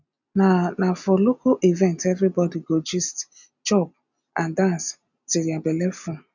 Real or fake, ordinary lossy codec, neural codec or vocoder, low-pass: real; none; none; 7.2 kHz